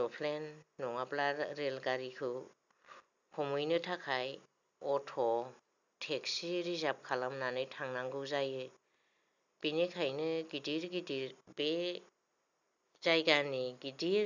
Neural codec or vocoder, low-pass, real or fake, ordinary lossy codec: none; 7.2 kHz; real; none